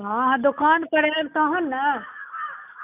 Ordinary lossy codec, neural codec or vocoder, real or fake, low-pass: none; none; real; 3.6 kHz